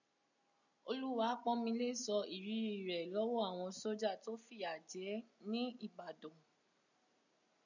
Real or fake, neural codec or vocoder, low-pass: real; none; 7.2 kHz